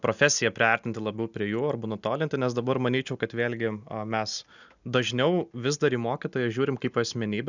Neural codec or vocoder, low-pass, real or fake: none; 7.2 kHz; real